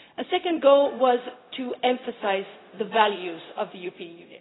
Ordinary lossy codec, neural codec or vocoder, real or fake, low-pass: AAC, 16 kbps; codec, 16 kHz, 0.4 kbps, LongCat-Audio-Codec; fake; 7.2 kHz